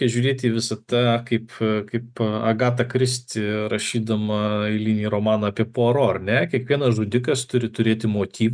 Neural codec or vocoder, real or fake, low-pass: none; real; 9.9 kHz